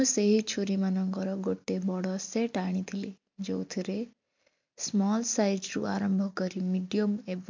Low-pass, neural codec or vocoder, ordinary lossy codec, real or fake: 7.2 kHz; none; MP3, 64 kbps; real